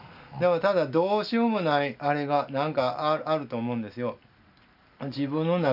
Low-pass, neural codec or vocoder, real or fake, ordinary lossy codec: 5.4 kHz; none; real; none